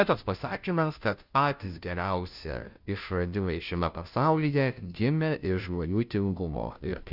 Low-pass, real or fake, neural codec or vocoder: 5.4 kHz; fake; codec, 16 kHz, 0.5 kbps, FunCodec, trained on Chinese and English, 25 frames a second